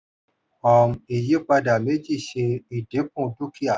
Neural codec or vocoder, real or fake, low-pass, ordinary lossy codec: none; real; none; none